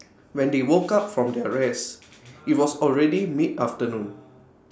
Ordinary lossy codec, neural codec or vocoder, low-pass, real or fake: none; none; none; real